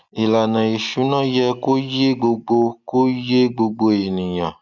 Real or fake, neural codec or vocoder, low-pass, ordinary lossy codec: real; none; 7.2 kHz; none